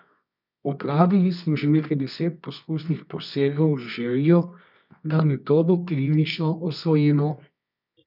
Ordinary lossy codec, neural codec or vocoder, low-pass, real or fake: none; codec, 24 kHz, 0.9 kbps, WavTokenizer, medium music audio release; 5.4 kHz; fake